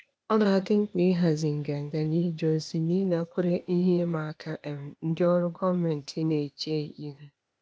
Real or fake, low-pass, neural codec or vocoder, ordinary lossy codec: fake; none; codec, 16 kHz, 0.8 kbps, ZipCodec; none